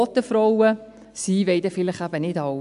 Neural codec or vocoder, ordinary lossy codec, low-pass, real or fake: none; none; 10.8 kHz; real